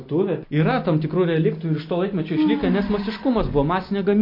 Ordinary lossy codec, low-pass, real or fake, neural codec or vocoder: MP3, 32 kbps; 5.4 kHz; real; none